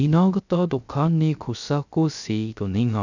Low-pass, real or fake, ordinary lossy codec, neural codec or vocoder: 7.2 kHz; fake; none; codec, 16 kHz, 0.3 kbps, FocalCodec